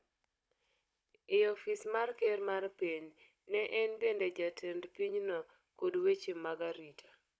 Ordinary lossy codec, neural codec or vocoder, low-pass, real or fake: none; codec, 16 kHz, 16 kbps, FunCodec, trained on Chinese and English, 50 frames a second; none; fake